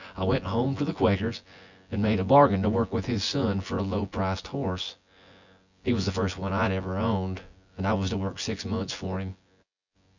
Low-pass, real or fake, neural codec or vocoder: 7.2 kHz; fake; vocoder, 24 kHz, 100 mel bands, Vocos